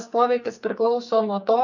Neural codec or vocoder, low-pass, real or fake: codec, 44.1 kHz, 2.6 kbps, SNAC; 7.2 kHz; fake